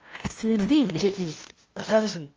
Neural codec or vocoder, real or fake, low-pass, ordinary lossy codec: codec, 16 kHz, 0.5 kbps, FunCodec, trained on LibriTTS, 25 frames a second; fake; 7.2 kHz; Opus, 24 kbps